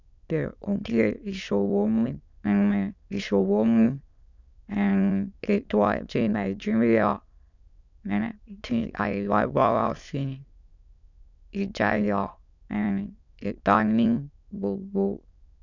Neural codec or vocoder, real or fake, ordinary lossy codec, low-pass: autoencoder, 22.05 kHz, a latent of 192 numbers a frame, VITS, trained on many speakers; fake; none; 7.2 kHz